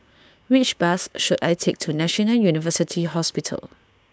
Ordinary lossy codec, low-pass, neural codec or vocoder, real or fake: none; none; codec, 16 kHz, 6 kbps, DAC; fake